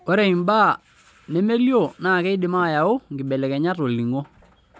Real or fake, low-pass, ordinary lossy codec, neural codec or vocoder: real; none; none; none